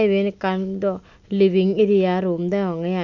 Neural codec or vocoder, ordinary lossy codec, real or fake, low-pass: none; none; real; 7.2 kHz